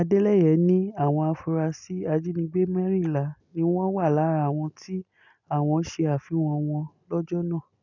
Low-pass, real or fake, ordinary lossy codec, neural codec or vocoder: 7.2 kHz; real; none; none